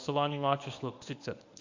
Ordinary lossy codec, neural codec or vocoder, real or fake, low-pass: AAC, 48 kbps; codec, 24 kHz, 0.9 kbps, WavTokenizer, medium speech release version 1; fake; 7.2 kHz